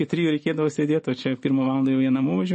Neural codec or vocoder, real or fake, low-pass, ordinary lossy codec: none; real; 9.9 kHz; MP3, 32 kbps